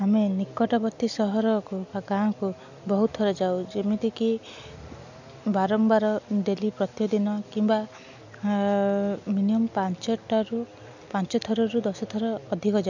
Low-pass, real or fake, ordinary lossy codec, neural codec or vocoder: 7.2 kHz; real; none; none